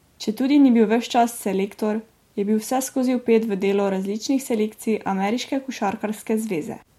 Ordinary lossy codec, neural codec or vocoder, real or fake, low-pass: MP3, 64 kbps; none; real; 19.8 kHz